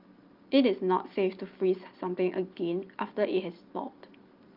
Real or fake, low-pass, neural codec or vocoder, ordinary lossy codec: real; 5.4 kHz; none; Opus, 32 kbps